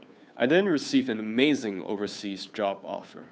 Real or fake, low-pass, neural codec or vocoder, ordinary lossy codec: fake; none; codec, 16 kHz, 2 kbps, FunCodec, trained on Chinese and English, 25 frames a second; none